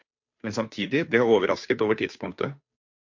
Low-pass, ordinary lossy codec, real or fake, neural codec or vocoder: 7.2 kHz; MP3, 48 kbps; fake; codec, 16 kHz, 2 kbps, FunCodec, trained on Chinese and English, 25 frames a second